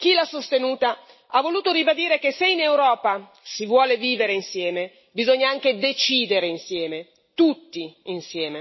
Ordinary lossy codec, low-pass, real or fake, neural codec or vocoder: MP3, 24 kbps; 7.2 kHz; real; none